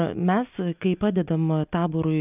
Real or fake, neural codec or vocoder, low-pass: real; none; 3.6 kHz